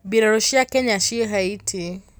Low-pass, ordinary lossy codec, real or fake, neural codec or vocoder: none; none; real; none